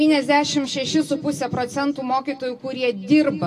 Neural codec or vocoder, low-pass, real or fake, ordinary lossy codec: none; 14.4 kHz; real; AAC, 64 kbps